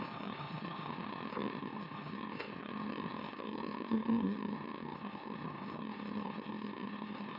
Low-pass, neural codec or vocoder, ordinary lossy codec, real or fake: 5.4 kHz; autoencoder, 44.1 kHz, a latent of 192 numbers a frame, MeloTTS; none; fake